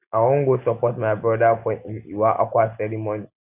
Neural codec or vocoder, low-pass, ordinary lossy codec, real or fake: none; 3.6 kHz; none; real